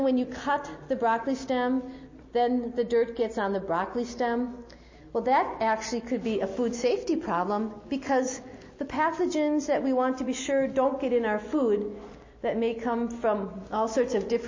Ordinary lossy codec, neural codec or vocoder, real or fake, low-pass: MP3, 32 kbps; none; real; 7.2 kHz